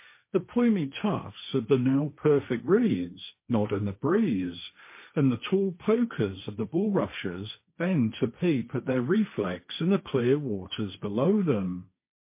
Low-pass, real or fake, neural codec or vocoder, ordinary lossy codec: 3.6 kHz; fake; codec, 16 kHz, 1.1 kbps, Voila-Tokenizer; MP3, 24 kbps